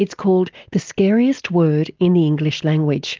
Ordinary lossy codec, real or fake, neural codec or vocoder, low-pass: Opus, 32 kbps; real; none; 7.2 kHz